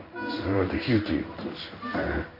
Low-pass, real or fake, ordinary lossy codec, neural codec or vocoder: 5.4 kHz; fake; none; codec, 16 kHz in and 24 kHz out, 1 kbps, XY-Tokenizer